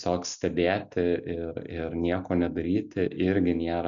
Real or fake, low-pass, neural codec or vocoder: real; 7.2 kHz; none